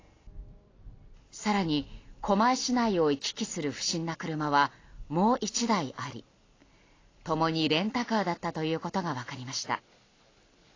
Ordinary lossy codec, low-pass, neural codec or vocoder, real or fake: AAC, 32 kbps; 7.2 kHz; none; real